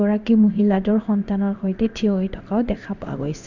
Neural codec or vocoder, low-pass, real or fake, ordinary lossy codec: codec, 16 kHz in and 24 kHz out, 1 kbps, XY-Tokenizer; 7.2 kHz; fake; none